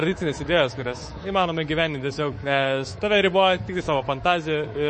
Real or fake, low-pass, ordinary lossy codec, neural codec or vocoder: fake; 10.8 kHz; MP3, 32 kbps; codec, 24 kHz, 3.1 kbps, DualCodec